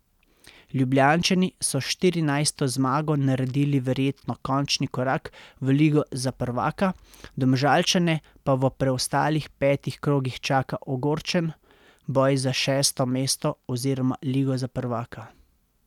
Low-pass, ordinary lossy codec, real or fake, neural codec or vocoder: 19.8 kHz; none; real; none